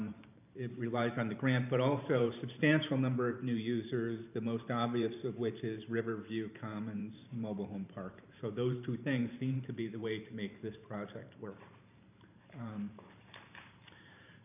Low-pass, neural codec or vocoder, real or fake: 3.6 kHz; vocoder, 44.1 kHz, 128 mel bands every 512 samples, BigVGAN v2; fake